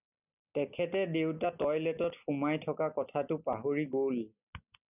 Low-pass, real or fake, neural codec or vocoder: 3.6 kHz; real; none